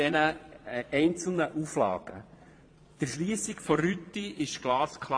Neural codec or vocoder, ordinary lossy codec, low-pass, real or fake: vocoder, 22.05 kHz, 80 mel bands, Vocos; AAC, 48 kbps; 9.9 kHz; fake